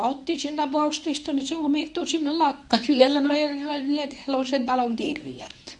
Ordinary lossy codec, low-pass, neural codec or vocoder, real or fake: none; none; codec, 24 kHz, 0.9 kbps, WavTokenizer, medium speech release version 1; fake